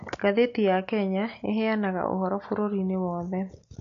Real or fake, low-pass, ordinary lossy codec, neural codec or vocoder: real; 7.2 kHz; AAC, 48 kbps; none